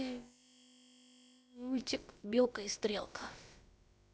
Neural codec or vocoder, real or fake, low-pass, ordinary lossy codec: codec, 16 kHz, about 1 kbps, DyCAST, with the encoder's durations; fake; none; none